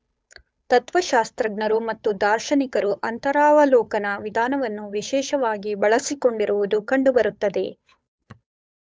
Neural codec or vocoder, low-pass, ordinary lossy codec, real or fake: codec, 16 kHz, 8 kbps, FunCodec, trained on Chinese and English, 25 frames a second; none; none; fake